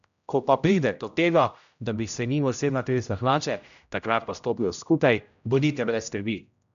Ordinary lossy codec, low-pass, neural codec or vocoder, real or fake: none; 7.2 kHz; codec, 16 kHz, 0.5 kbps, X-Codec, HuBERT features, trained on general audio; fake